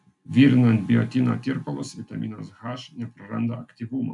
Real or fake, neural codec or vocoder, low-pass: real; none; 10.8 kHz